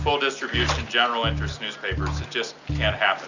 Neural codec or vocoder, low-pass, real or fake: none; 7.2 kHz; real